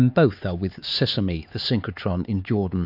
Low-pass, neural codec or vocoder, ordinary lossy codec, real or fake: 5.4 kHz; codec, 16 kHz, 4 kbps, X-Codec, HuBERT features, trained on LibriSpeech; AAC, 48 kbps; fake